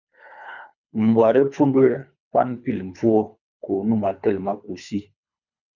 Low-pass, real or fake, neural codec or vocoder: 7.2 kHz; fake; codec, 24 kHz, 3 kbps, HILCodec